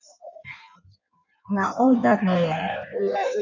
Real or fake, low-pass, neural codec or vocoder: fake; 7.2 kHz; codec, 16 kHz in and 24 kHz out, 1.1 kbps, FireRedTTS-2 codec